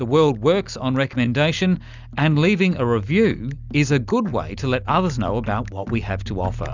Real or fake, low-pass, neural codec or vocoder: real; 7.2 kHz; none